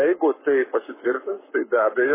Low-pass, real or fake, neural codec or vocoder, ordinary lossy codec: 3.6 kHz; fake; codec, 44.1 kHz, 7.8 kbps, Pupu-Codec; MP3, 16 kbps